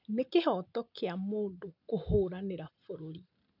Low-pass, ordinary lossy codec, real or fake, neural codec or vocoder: 5.4 kHz; none; real; none